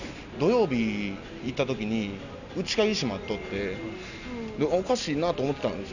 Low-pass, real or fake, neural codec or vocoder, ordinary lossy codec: 7.2 kHz; real; none; none